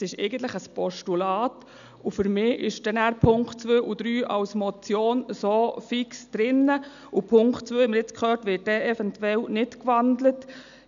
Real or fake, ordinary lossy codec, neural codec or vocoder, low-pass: real; none; none; 7.2 kHz